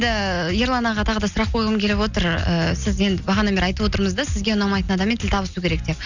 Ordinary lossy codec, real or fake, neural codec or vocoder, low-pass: none; real; none; 7.2 kHz